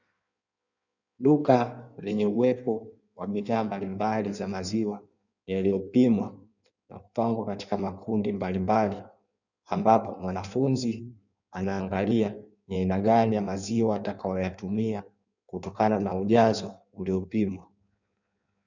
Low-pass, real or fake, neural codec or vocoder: 7.2 kHz; fake; codec, 16 kHz in and 24 kHz out, 1.1 kbps, FireRedTTS-2 codec